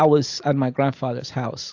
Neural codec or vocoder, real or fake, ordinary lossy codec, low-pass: none; real; AAC, 48 kbps; 7.2 kHz